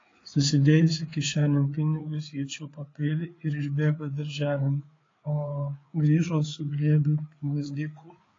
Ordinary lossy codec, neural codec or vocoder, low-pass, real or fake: AAC, 32 kbps; codec, 16 kHz, 4 kbps, FreqCodec, larger model; 7.2 kHz; fake